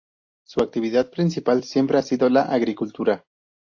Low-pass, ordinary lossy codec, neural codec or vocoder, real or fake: 7.2 kHz; AAC, 48 kbps; none; real